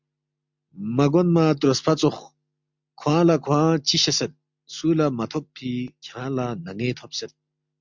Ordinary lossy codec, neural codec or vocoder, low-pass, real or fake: MP3, 64 kbps; none; 7.2 kHz; real